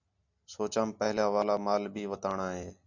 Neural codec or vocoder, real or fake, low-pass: none; real; 7.2 kHz